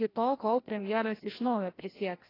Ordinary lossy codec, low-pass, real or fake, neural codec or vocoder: AAC, 24 kbps; 5.4 kHz; fake; codec, 16 kHz, 1 kbps, FreqCodec, larger model